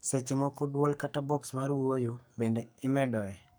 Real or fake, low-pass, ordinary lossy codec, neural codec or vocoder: fake; none; none; codec, 44.1 kHz, 2.6 kbps, SNAC